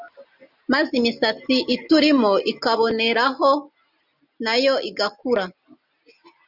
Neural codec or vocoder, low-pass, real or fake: none; 5.4 kHz; real